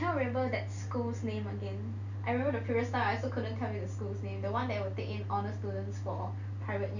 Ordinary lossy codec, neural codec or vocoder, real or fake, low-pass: none; none; real; 7.2 kHz